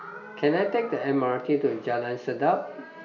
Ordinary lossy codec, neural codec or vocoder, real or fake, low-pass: none; none; real; 7.2 kHz